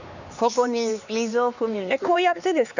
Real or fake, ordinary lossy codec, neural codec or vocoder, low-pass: fake; none; codec, 16 kHz, 4 kbps, X-Codec, HuBERT features, trained on LibriSpeech; 7.2 kHz